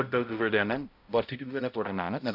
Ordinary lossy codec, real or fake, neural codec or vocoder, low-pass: AAC, 48 kbps; fake; codec, 16 kHz, 0.5 kbps, X-Codec, HuBERT features, trained on balanced general audio; 5.4 kHz